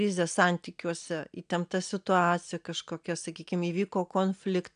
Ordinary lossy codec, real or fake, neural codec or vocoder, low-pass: AAC, 96 kbps; real; none; 9.9 kHz